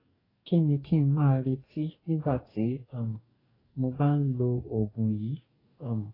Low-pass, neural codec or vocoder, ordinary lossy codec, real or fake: 5.4 kHz; codec, 44.1 kHz, 2.6 kbps, DAC; AAC, 24 kbps; fake